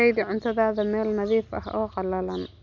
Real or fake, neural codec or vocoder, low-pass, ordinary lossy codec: real; none; 7.2 kHz; none